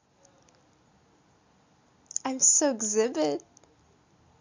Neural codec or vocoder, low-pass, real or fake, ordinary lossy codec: none; 7.2 kHz; real; MP3, 48 kbps